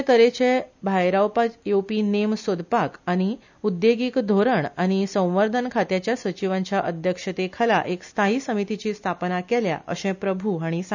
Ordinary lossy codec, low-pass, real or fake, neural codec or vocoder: none; 7.2 kHz; real; none